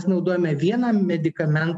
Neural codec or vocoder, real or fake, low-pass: none; real; 10.8 kHz